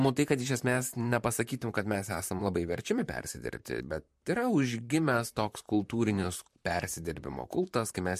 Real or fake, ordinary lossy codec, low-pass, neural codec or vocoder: fake; MP3, 64 kbps; 14.4 kHz; vocoder, 44.1 kHz, 128 mel bands, Pupu-Vocoder